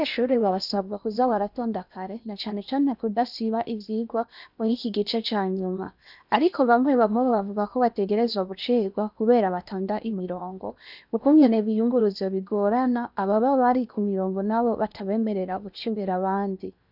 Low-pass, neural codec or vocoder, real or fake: 5.4 kHz; codec, 16 kHz in and 24 kHz out, 0.8 kbps, FocalCodec, streaming, 65536 codes; fake